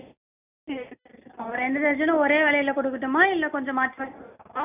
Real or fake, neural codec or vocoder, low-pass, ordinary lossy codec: real; none; 3.6 kHz; none